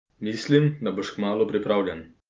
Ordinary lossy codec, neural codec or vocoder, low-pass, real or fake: Opus, 24 kbps; none; 7.2 kHz; real